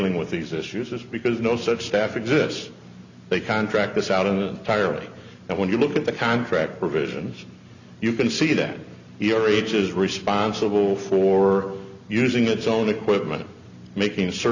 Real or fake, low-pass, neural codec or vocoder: real; 7.2 kHz; none